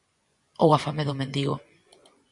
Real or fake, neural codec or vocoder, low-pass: fake; vocoder, 24 kHz, 100 mel bands, Vocos; 10.8 kHz